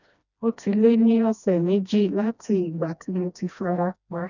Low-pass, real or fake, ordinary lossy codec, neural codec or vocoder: 7.2 kHz; fake; none; codec, 16 kHz, 1 kbps, FreqCodec, smaller model